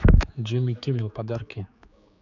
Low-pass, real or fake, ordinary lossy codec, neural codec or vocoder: 7.2 kHz; fake; none; codec, 16 kHz, 4 kbps, X-Codec, HuBERT features, trained on general audio